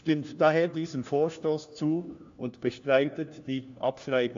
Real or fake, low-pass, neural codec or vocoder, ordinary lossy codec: fake; 7.2 kHz; codec, 16 kHz, 1 kbps, FunCodec, trained on LibriTTS, 50 frames a second; AAC, 64 kbps